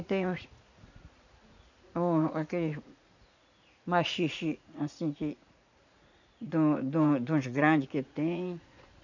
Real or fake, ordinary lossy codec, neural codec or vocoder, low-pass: fake; none; vocoder, 44.1 kHz, 80 mel bands, Vocos; 7.2 kHz